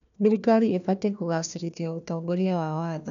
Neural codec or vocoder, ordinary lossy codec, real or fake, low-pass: codec, 16 kHz, 1 kbps, FunCodec, trained on Chinese and English, 50 frames a second; none; fake; 7.2 kHz